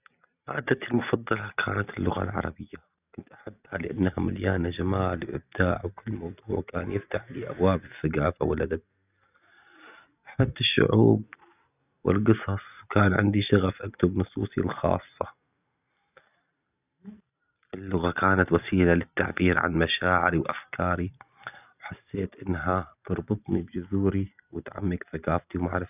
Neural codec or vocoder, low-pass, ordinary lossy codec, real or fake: none; 3.6 kHz; none; real